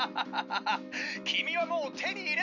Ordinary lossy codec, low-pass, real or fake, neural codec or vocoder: none; 7.2 kHz; real; none